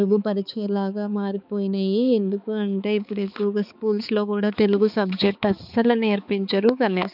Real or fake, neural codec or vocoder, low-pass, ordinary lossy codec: fake; codec, 16 kHz, 4 kbps, X-Codec, HuBERT features, trained on balanced general audio; 5.4 kHz; none